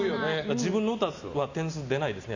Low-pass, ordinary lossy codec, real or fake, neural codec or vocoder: 7.2 kHz; none; real; none